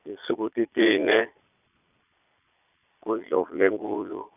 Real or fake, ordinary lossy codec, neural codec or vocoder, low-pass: fake; none; vocoder, 22.05 kHz, 80 mel bands, Vocos; 3.6 kHz